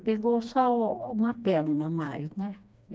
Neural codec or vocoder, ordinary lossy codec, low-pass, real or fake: codec, 16 kHz, 2 kbps, FreqCodec, smaller model; none; none; fake